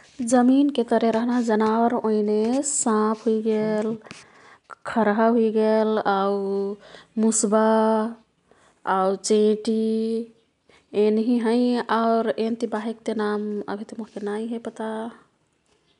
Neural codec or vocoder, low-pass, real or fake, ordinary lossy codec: none; 10.8 kHz; real; none